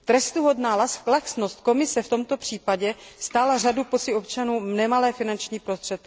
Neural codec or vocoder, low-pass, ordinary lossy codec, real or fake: none; none; none; real